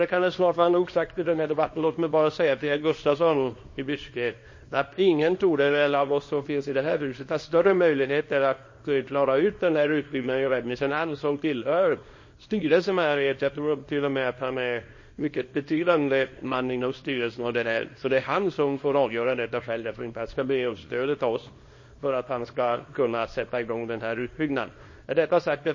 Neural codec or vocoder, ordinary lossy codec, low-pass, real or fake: codec, 24 kHz, 0.9 kbps, WavTokenizer, small release; MP3, 32 kbps; 7.2 kHz; fake